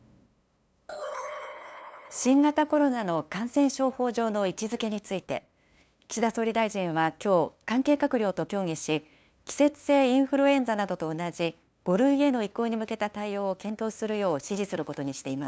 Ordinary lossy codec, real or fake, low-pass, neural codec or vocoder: none; fake; none; codec, 16 kHz, 2 kbps, FunCodec, trained on LibriTTS, 25 frames a second